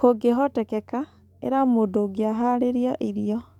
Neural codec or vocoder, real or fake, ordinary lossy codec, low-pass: codec, 44.1 kHz, 7.8 kbps, DAC; fake; none; 19.8 kHz